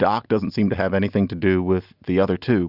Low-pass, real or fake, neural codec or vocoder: 5.4 kHz; real; none